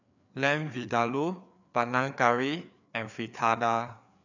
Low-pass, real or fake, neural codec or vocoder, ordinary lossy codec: 7.2 kHz; fake; codec, 16 kHz, 4 kbps, FreqCodec, larger model; none